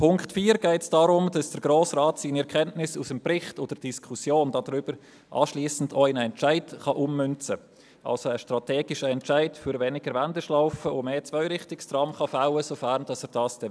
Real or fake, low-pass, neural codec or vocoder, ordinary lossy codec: real; none; none; none